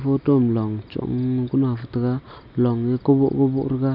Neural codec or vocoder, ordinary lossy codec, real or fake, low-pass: none; none; real; 5.4 kHz